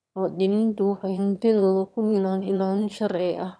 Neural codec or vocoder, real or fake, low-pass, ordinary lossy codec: autoencoder, 22.05 kHz, a latent of 192 numbers a frame, VITS, trained on one speaker; fake; none; none